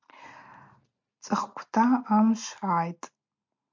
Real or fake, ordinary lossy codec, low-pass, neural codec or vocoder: real; MP3, 48 kbps; 7.2 kHz; none